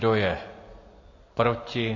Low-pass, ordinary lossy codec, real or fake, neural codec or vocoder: 7.2 kHz; MP3, 32 kbps; real; none